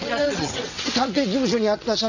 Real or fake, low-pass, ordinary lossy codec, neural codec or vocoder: fake; 7.2 kHz; none; vocoder, 22.05 kHz, 80 mel bands, WaveNeXt